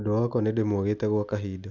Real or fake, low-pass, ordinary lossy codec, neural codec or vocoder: real; 7.2 kHz; none; none